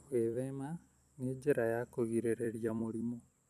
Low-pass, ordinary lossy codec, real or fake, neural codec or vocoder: 14.4 kHz; none; fake; vocoder, 44.1 kHz, 128 mel bands every 256 samples, BigVGAN v2